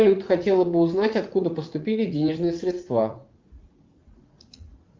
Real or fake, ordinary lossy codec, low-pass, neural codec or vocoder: fake; Opus, 32 kbps; 7.2 kHz; vocoder, 44.1 kHz, 80 mel bands, Vocos